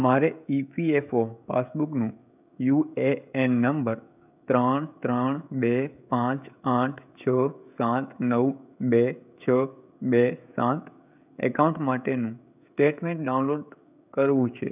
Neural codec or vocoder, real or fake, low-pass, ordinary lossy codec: codec, 16 kHz, 16 kbps, FreqCodec, smaller model; fake; 3.6 kHz; none